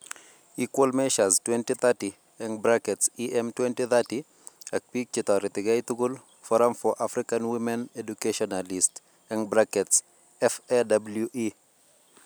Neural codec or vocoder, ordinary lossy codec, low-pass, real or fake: none; none; none; real